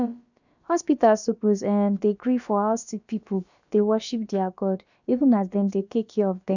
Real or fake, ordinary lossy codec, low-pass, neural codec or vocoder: fake; none; 7.2 kHz; codec, 16 kHz, about 1 kbps, DyCAST, with the encoder's durations